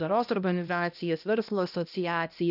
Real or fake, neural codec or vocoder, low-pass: fake; codec, 16 kHz, 1 kbps, X-Codec, HuBERT features, trained on balanced general audio; 5.4 kHz